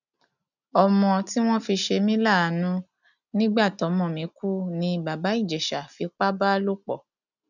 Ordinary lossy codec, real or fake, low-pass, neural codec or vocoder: none; real; 7.2 kHz; none